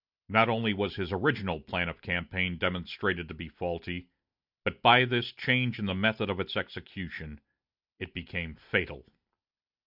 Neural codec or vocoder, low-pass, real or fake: none; 5.4 kHz; real